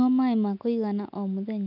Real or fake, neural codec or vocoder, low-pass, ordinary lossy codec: real; none; 5.4 kHz; none